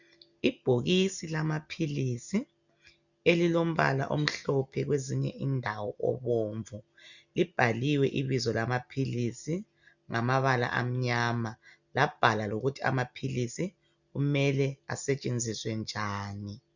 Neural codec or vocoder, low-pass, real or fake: none; 7.2 kHz; real